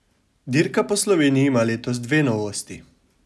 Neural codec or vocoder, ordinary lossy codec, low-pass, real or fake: none; none; none; real